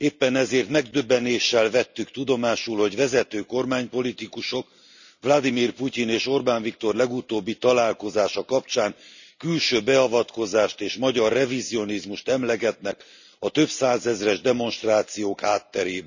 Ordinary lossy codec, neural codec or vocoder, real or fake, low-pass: none; none; real; 7.2 kHz